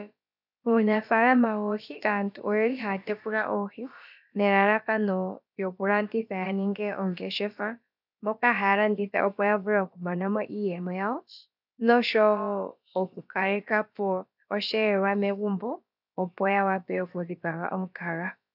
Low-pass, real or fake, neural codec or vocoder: 5.4 kHz; fake; codec, 16 kHz, about 1 kbps, DyCAST, with the encoder's durations